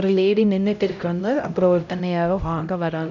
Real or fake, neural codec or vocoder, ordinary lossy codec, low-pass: fake; codec, 16 kHz, 0.5 kbps, X-Codec, HuBERT features, trained on LibriSpeech; none; 7.2 kHz